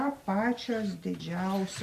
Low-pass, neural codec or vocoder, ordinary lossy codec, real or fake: 14.4 kHz; none; Opus, 64 kbps; real